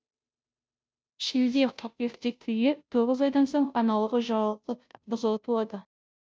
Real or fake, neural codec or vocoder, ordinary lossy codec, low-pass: fake; codec, 16 kHz, 0.5 kbps, FunCodec, trained on Chinese and English, 25 frames a second; none; none